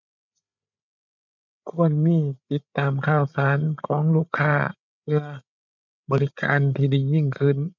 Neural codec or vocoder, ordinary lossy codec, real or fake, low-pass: codec, 16 kHz, 8 kbps, FreqCodec, larger model; none; fake; 7.2 kHz